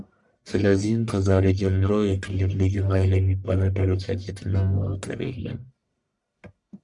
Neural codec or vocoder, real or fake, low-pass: codec, 44.1 kHz, 1.7 kbps, Pupu-Codec; fake; 10.8 kHz